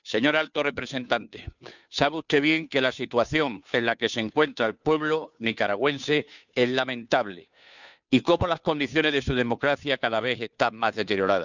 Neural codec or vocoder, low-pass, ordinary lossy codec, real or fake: codec, 16 kHz, 2 kbps, FunCodec, trained on Chinese and English, 25 frames a second; 7.2 kHz; none; fake